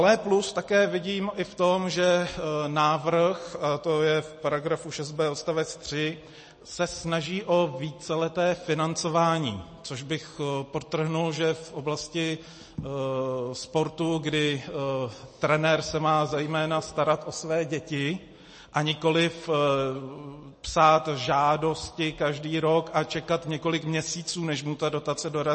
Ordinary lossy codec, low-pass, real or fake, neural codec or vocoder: MP3, 32 kbps; 10.8 kHz; real; none